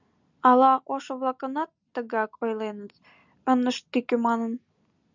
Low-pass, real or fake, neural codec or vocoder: 7.2 kHz; real; none